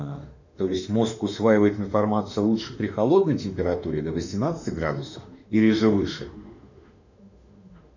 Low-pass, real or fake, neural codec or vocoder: 7.2 kHz; fake; autoencoder, 48 kHz, 32 numbers a frame, DAC-VAE, trained on Japanese speech